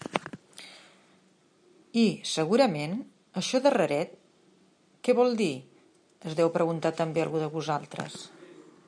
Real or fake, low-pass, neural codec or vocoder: real; 9.9 kHz; none